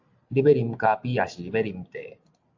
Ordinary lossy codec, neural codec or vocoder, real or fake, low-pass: AAC, 48 kbps; none; real; 7.2 kHz